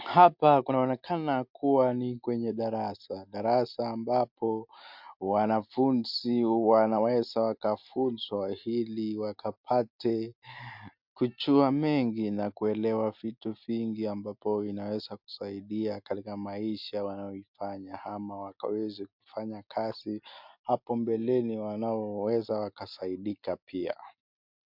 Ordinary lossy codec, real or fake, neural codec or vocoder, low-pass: MP3, 48 kbps; real; none; 5.4 kHz